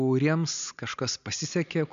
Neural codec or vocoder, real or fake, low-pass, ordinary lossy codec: none; real; 7.2 kHz; MP3, 64 kbps